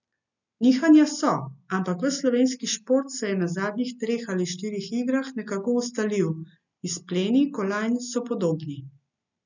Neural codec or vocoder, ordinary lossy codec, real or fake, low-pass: none; none; real; 7.2 kHz